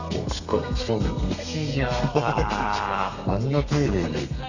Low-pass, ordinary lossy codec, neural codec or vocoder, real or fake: 7.2 kHz; none; codec, 32 kHz, 1.9 kbps, SNAC; fake